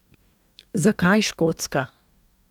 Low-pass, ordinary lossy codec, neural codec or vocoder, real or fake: 19.8 kHz; none; codec, 44.1 kHz, 2.6 kbps, DAC; fake